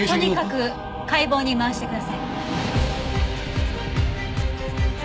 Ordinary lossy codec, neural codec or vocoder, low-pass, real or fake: none; none; none; real